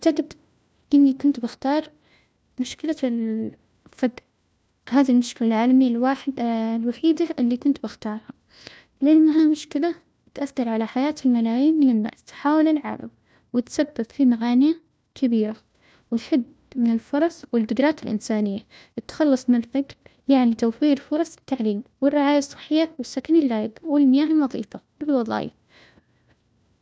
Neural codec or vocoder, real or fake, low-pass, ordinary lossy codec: codec, 16 kHz, 1 kbps, FunCodec, trained on LibriTTS, 50 frames a second; fake; none; none